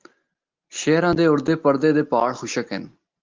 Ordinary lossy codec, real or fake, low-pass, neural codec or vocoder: Opus, 32 kbps; real; 7.2 kHz; none